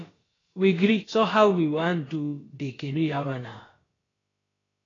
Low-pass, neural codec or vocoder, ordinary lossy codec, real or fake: 7.2 kHz; codec, 16 kHz, about 1 kbps, DyCAST, with the encoder's durations; AAC, 32 kbps; fake